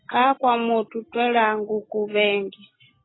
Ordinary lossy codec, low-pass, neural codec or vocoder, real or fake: AAC, 16 kbps; 7.2 kHz; none; real